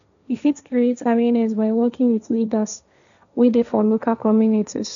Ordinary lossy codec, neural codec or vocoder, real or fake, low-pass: none; codec, 16 kHz, 1.1 kbps, Voila-Tokenizer; fake; 7.2 kHz